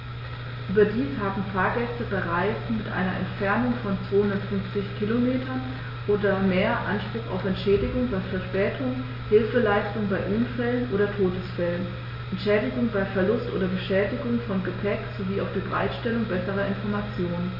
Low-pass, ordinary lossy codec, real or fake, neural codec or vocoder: 5.4 kHz; MP3, 32 kbps; real; none